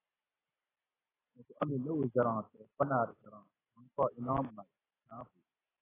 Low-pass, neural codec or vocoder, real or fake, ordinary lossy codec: 3.6 kHz; vocoder, 44.1 kHz, 128 mel bands every 512 samples, BigVGAN v2; fake; AAC, 16 kbps